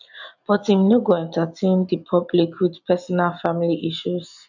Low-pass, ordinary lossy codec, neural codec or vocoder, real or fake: 7.2 kHz; none; none; real